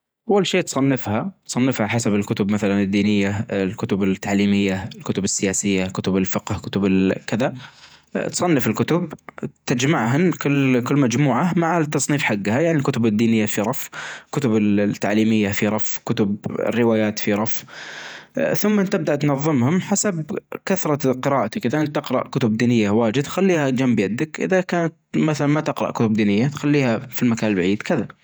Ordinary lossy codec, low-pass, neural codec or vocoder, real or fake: none; none; vocoder, 48 kHz, 128 mel bands, Vocos; fake